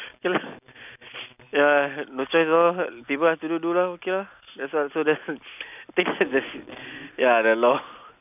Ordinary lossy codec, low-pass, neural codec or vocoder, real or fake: none; 3.6 kHz; none; real